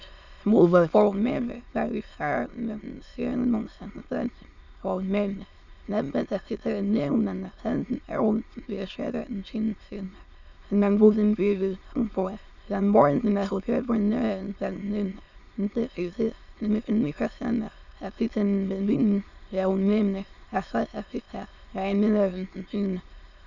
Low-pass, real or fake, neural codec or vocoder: 7.2 kHz; fake; autoencoder, 22.05 kHz, a latent of 192 numbers a frame, VITS, trained on many speakers